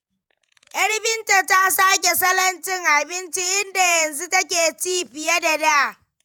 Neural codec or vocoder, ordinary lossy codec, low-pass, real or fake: vocoder, 48 kHz, 128 mel bands, Vocos; none; none; fake